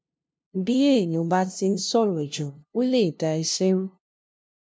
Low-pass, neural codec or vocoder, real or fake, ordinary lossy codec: none; codec, 16 kHz, 0.5 kbps, FunCodec, trained on LibriTTS, 25 frames a second; fake; none